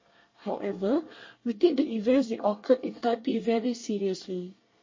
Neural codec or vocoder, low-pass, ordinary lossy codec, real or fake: codec, 24 kHz, 1 kbps, SNAC; 7.2 kHz; MP3, 32 kbps; fake